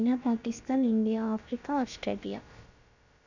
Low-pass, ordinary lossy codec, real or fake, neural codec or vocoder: 7.2 kHz; none; fake; codec, 16 kHz, about 1 kbps, DyCAST, with the encoder's durations